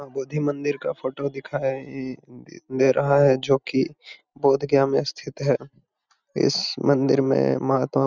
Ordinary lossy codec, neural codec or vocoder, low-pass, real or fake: none; none; 7.2 kHz; real